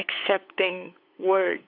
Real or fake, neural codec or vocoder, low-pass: fake; codec, 16 kHz, 8 kbps, FunCodec, trained on LibriTTS, 25 frames a second; 5.4 kHz